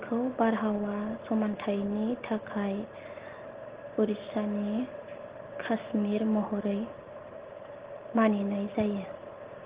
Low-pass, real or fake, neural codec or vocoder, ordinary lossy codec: 3.6 kHz; real; none; Opus, 16 kbps